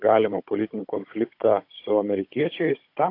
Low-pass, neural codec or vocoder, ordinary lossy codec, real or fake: 5.4 kHz; codec, 16 kHz, 16 kbps, FunCodec, trained on Chinese and English, 50 frames a second; AAC, 32 kbps; fake